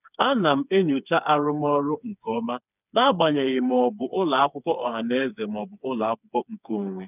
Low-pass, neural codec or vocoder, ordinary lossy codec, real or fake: 3.6 kHz; codec, 16 kHz, 4 kbps, FreqCodec, smaller model; none; fake